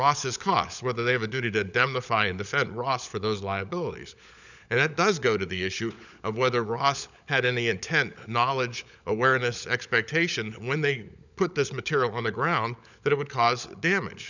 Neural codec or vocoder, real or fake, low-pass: codec, 16 kHz, 16 kbps, FunCodec, trained on LibriTTS, 50 frames a second; fake; 7.2 kHz